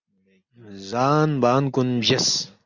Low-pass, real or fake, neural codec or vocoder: 7.2 kHz; real; none